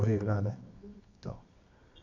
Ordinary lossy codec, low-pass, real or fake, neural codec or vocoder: none; 7.2 kHz; fake; codec, 24 kHz, 0.9 kbps, WavTokenizer, medium music audio release